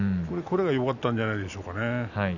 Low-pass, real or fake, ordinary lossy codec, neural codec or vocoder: 7.2 kHz; real; none; none